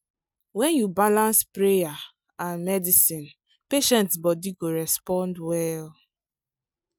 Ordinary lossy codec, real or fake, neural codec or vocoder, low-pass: none; real; none; none